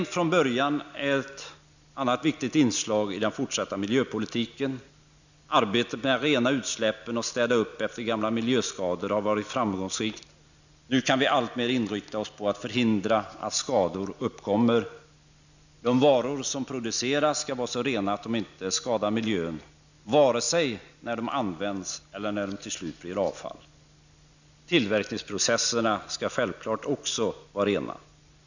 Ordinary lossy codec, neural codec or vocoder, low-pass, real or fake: none; none; 7.2 kHz; real